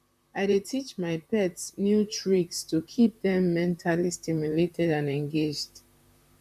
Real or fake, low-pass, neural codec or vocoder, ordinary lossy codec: fake; 14.4 kHz; vocoder, 44.1 kHz, 128 mel bands, Pupu-Vocoder; none